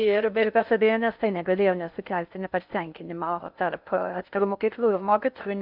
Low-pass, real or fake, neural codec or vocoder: 5.4 kHz; fake; codec, 16 kHz in and 24 kHz out, 0.6 kbps, FocalCodec, streaming, 4096 codes